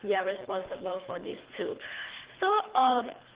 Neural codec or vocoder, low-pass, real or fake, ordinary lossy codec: codec, 24 kHz, 3 kbps, HILCodec; 3.6 kHz; fake; Opus, 16 kbps